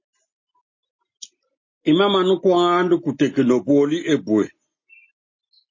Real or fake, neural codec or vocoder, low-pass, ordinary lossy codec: real; none; 7.2 kHz; MP3, 32 kbps